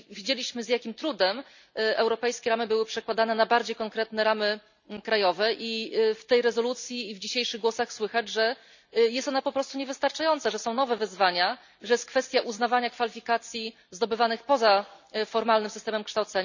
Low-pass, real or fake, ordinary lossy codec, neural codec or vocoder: 7.2 kHz; real; none; none